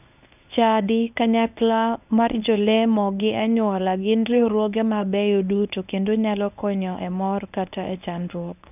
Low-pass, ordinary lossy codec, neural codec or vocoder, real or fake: 3.6 kHz; none; codec, 24 kHz, 0.9 kbps, WavTokenizer, medium speech release version 1; fake